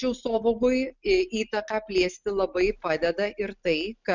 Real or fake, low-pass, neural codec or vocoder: real; 7.2 kHz; none